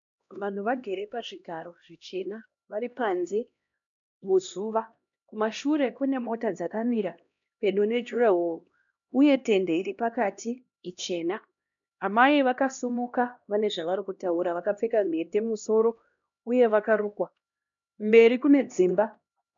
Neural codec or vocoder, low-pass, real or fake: codec, 16 kHz, 1 kbps, X-Codec, HuBERT features, trained on LibriSpeech; 7.2 kHz; fake